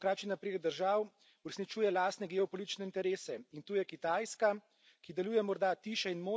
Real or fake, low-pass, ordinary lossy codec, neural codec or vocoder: real; none; none; none